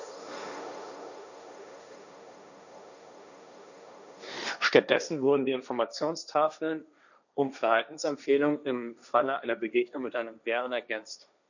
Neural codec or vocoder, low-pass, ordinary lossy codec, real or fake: codec, 16 kHz, 1.1 kbps, Voila-Tokenizer; 7.2 kHz; none; fake